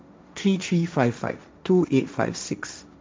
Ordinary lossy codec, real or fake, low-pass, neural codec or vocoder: none; fake; none; codec, 16 kHz, 1.1 kbps, Voila-Tokenizer